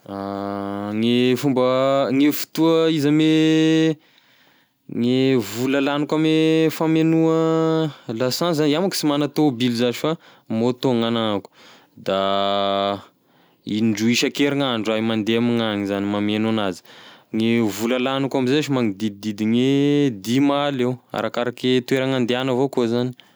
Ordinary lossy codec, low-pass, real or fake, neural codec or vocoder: none; none; real; none